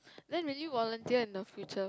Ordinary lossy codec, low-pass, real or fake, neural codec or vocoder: none; none; real; none